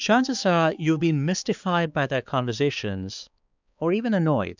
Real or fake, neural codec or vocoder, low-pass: fake; codec, 16 kHz, 4 kbps, X-Codec, HuBERT features, trained on balanced general audio; 7.2 kHz